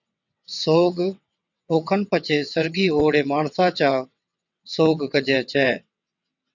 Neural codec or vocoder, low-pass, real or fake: vocoder, 22.05 kHz, 80 mel bands, WaveNeXt; 7.2 kHz; fake